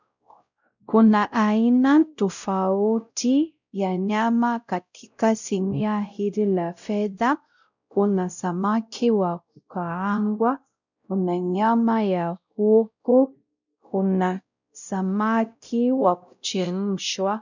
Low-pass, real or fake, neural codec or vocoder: 7.2 kHz; fake; codec, 16 kHz, 0.5 kbps, X-Codec, WavLM features, trained on Multilingual LibriSpeech